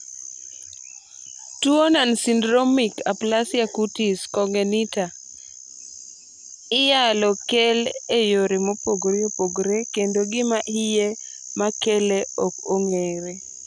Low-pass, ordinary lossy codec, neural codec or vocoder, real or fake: 9.9 kHz; MP3, 96 kbps; none; real